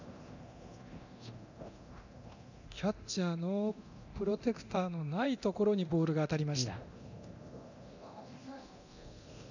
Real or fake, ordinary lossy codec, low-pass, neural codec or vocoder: fake; none; 7.2 kHz; codec, 24 kHz, 0.9 kbps, DualCodec